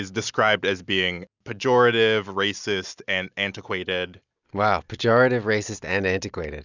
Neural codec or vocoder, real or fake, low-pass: none; real; 7.2 kHz